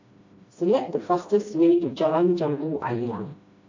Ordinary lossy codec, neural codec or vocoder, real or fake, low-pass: AAC, 48 kbps; codec, 16 kHz, 1 kbps, FreqCodec, smaller model; fake; 7.2 kHz